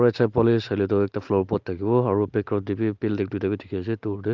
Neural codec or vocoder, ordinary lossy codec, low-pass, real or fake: none; Opus, 32 kbps; 7.2 kHz; real